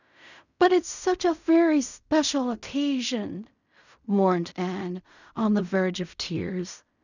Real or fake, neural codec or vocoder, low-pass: fake; codec, 16 kHz in and 24 kHz out, 0.4 kbps, LongCat-Audio-Codec, fine tuned four codebook decoder; 7.2 kHz